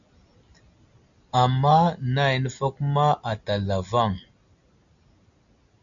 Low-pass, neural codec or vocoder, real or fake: 7.2 kHz; none; real